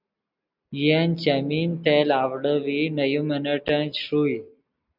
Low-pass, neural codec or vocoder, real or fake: 5.4 kHz; none; real